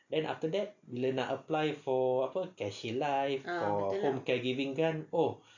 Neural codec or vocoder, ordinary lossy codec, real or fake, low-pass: none; none; real; 7.2 kHz